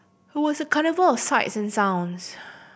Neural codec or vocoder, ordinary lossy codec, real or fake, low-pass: none; none; real; none